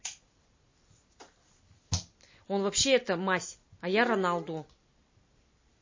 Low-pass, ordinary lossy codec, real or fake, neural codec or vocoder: 7.2 kHz; MP3, 32 kbps; real; none